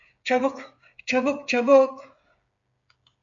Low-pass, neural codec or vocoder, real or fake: 7.2 kHz; codec, 16 kHz, 8 kbps, FreqCodec, smaller model; fake